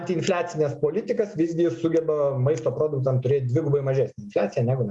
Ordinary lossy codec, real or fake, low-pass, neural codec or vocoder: Opus, 64 kbps; real; 10.8 kHz; none